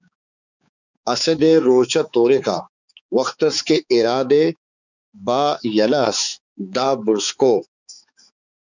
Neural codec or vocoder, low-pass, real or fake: codec, 16 kHz, 4 kbps, X-Codec, HuBERT features, trained on balanced general audio; 7.2 kHz; fake